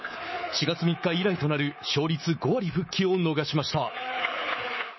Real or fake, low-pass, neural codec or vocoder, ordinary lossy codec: fake; 7.2 kHz; codec, 24 kHz, 3.1 kbps, DualCodec; MP3, 24 kbps